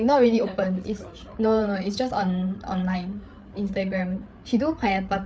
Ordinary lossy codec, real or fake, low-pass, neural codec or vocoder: none; fake; none; codec, 16 kHz, 8 kbps, FreqCodec, larger model